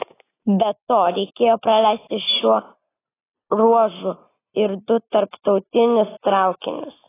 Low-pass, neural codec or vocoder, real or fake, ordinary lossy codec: 3.6 kHz; none; real; AAC, 16 kbps